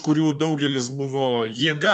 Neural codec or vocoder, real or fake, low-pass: codec, 24 kHz, 1 kbps, SNAC; fake; 10.8 kHz